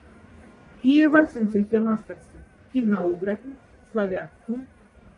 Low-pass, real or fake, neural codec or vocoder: 10.8 kHz; fake; codec, 44.1 kHz, 1.7 kbps, Pupu-Codec